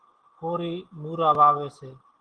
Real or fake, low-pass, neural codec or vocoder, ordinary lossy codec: real; 9.9 kHz; none; Opus, 16 kbps